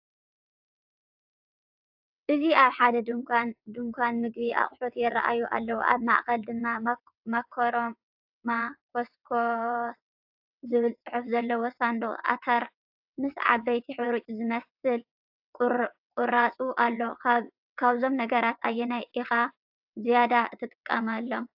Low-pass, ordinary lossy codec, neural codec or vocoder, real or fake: 5.4 kHz; AAC, 48 kbps; vocoder, 22.05 kHz, 80 mel bands, WaveNeXt; fake